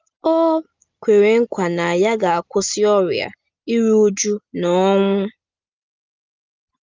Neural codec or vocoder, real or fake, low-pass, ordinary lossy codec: none; real; 7.2 kHz; Opus, 24 kbps